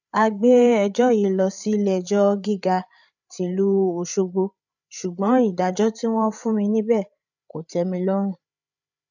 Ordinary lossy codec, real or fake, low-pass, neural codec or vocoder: none; fake; 7.2 kHz; codec, 16 kHz, 8 kbps, FreqCodec, larger model